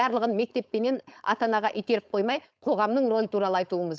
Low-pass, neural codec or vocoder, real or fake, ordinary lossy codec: none; codec, 16 kHz, 4.8 kbps, FACodec; fake; none